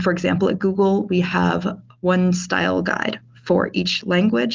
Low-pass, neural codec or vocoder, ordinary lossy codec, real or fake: 7.2 kHz; none; Opus, 32 kbps; real